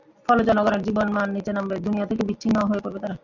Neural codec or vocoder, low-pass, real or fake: none; 7.2 kHz; real